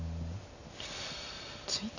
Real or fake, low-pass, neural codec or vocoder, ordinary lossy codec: real; 7.2 kHz; none; none